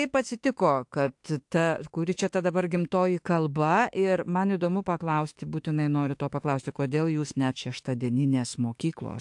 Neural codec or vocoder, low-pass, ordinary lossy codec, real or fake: autoencoder, 48 kHz, 32 numbers a frame, DAC-VAE, trained on Japanese speech; 10.8 kHz; AAC, 64 kbps; fake